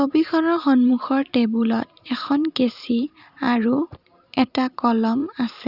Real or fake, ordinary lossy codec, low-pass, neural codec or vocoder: fake; Opus, 64 kbps; 5.4 kHz; vocoder, 44.1 kHz, 128 mel bands every 512 samples, BigVGAN v2